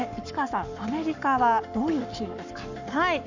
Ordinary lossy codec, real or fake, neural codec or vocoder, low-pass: none; fake; codec, 44.1 kHz, 7.8 kbps, Pupu-Codec; 7.2 kHz